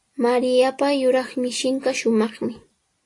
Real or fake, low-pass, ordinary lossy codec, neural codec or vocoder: real; 10.8 kHz; AAC, 48 kbps; none